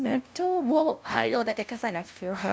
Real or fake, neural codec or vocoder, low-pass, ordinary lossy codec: fake; codec, 16 kHz, 0.5 kbps, FunCodec, trained on LibriTTS, 25 frames a second; none; none